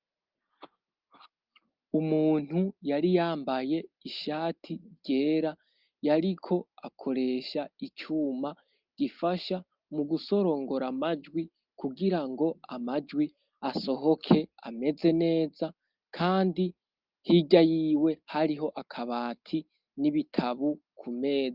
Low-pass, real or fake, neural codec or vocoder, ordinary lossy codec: 5.4 kHz; real; none; Opus, 32 kbps